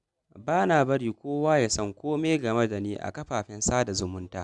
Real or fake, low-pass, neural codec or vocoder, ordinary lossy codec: real; 10.8 kHz; none; none